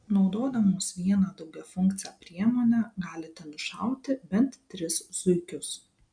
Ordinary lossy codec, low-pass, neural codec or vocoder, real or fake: MP3, 96 kbps; 9.9 kHz; none; real